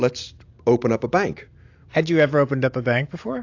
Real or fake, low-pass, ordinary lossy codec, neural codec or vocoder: real; 7.2 kHz; AAC, 48 kbps; none